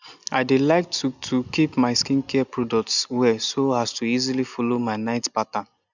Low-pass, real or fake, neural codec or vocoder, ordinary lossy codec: 7.2 kHz; real; none; none